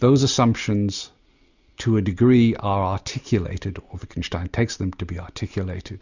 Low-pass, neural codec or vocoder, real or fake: 7.2 kHz; none; real